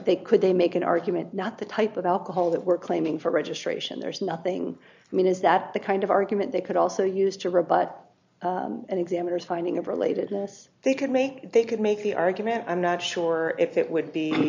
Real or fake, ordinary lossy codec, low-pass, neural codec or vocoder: real; MP3, 64 kbps; 7.2 kHz; none